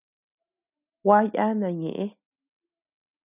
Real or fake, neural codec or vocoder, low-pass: real; none; 3.6 kHz